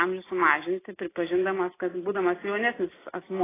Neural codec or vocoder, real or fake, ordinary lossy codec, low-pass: none; real; AAC, 16 kbps; 3.6 kHz